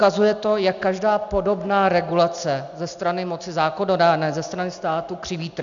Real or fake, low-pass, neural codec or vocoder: real; 7.2 kHz; none